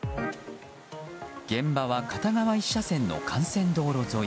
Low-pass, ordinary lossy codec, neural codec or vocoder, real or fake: none; none; none; real